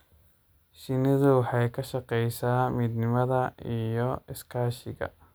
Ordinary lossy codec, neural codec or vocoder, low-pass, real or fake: none; none; none; real